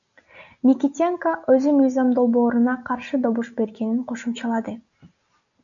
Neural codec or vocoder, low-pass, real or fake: none; 7.2 kHz; real